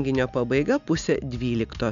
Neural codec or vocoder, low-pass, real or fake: none; 7.2 kHz; real